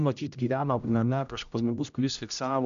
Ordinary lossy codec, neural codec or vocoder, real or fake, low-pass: AAC, 96 kbps; codec, 16 kHz, 0.5 kbps, X-Codec, HuBERT features, trained on general audio; fake; 7.2 kHz